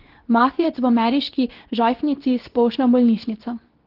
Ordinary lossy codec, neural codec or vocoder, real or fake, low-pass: Opus, 16 kbps; codec, 24 kHz, 0.9 kbps, WavTokenizer, medium speech release version 2; fake; 5.4 kHz